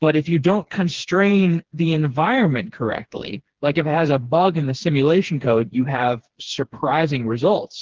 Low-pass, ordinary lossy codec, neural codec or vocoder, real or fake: 7.2 kHz; Opus, 16 kbps; codec, 16 kHz, 2 kbps, FreqCodec, smaller model; fake